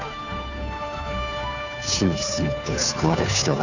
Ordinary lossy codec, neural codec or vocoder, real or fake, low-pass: none; codec, 16 kHz, 2 kbps, FunCodec, trained on Chinese and English, 25 frames a second; fake; 7.2 kHz